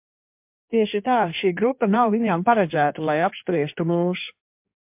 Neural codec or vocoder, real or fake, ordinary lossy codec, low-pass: codec, 16 kHz in and 24 kHz out, 1.1 kbps, FireRedTTS-2 codec; fake; MP3, 32 kbps; 3.6 kHz